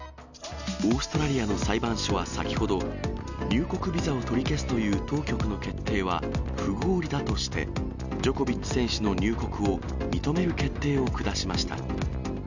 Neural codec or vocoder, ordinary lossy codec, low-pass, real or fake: none; none; 7.2 kHz; real